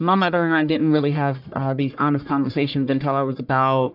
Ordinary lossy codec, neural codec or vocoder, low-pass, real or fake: AAC, 48 kbps; codec, 44.1 kHz, 1.7 kbps, Pupu-Codec; 5.4 kHz; fake